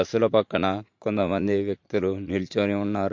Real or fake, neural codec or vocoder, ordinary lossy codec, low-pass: fake; vocoder, 44.1 kHz, 80 mel bands, Vocos; MP3, 48 kbps; 7.2 kHz